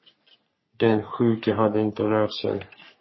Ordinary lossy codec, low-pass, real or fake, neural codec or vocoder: MP3, 24 kbps; 7.2 kHz; fake; codec, 44.1 kHz, 3.4 kbps, Pupu-Codec